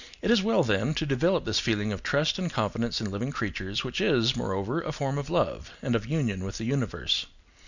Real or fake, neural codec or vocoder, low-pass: real; none; 7.2 kHz